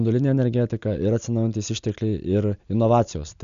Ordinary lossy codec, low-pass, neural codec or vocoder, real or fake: AAC, 96 kbps; 7.2 kHz; none; real